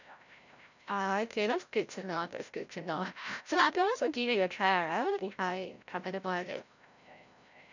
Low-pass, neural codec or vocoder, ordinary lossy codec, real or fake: 7.2 kHz; codec, 16 kHz, 0.5 kbps, FreqCodec, larger model; none; fake